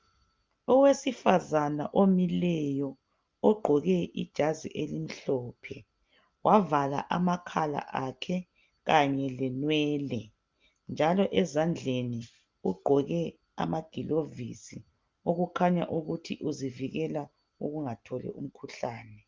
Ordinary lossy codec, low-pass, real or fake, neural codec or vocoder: Opus, 24 kbps; 7.2 kHz; real; none